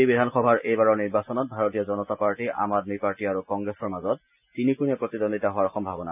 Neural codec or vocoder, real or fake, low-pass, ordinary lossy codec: none; real; 3.6 kHz; none